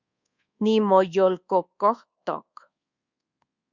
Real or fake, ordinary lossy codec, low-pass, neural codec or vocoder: fake; Opus, 64 kbps; 7.2 kHz; codec, 24 kHz, 1.2 kbps, DualCodec